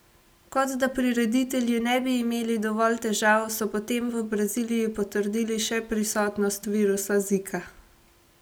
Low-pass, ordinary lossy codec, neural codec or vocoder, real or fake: none; none; none; real